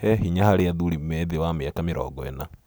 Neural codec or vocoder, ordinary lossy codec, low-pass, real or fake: none; none; none; real